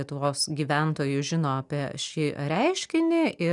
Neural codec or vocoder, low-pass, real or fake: none; 10.8 kHz; real